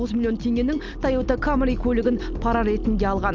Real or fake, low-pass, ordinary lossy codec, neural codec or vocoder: real; 7.2 kHz; Opus, 24 kbps; none